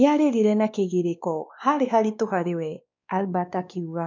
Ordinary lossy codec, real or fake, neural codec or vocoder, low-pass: none; fake; codec, 16 kHz, 2 kbps, X-Codec, WavLM features, trained on Multilingual LibriSpeech; 7.2 kHz